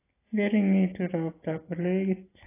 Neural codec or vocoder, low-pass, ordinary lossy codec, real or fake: none; 3.6 kHz; AAC, 16 kbps; real